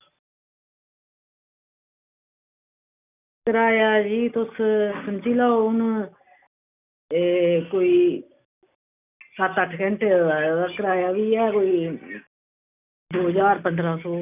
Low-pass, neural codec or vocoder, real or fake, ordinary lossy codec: 3.6 kHz; none; real; none